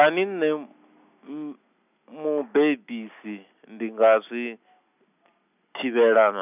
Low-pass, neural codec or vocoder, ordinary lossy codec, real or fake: 3.6 kHz; none; none; real